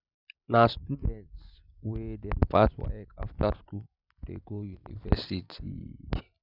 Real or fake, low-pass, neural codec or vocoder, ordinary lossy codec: real; 5.4 kHz; none; none